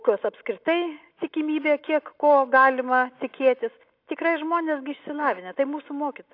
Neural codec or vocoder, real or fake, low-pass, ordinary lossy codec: none; real; 5.4 kHz; AAC, 32 kbps